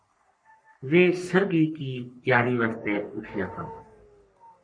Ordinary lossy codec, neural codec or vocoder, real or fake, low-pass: MP3, 48 kbps; codec, 44.1 kHz, 3.4 kbps, Pupu-Codec; fake; 9.9 kHz